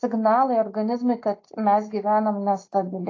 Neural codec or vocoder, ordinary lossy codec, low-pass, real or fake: none; AAC, 32 kbps; 7.2 kHz; real